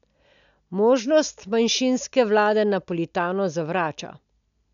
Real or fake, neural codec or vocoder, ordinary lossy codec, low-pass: real; none; none; 7.2 kHz